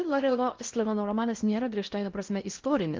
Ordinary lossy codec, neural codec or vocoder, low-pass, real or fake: Opus, 24 kbps; codec, 16 kHz in and 24 kHz out, 0.6 kbps, FocalCodec, streaming, 4096 codes; 7.2 kHz; fake